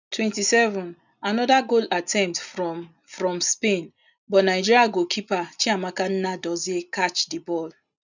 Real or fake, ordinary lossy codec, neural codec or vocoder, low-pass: real; none; none; 7.2 kHz